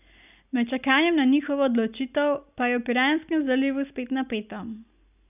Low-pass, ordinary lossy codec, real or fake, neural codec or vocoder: 3.6 kHz; none; real; none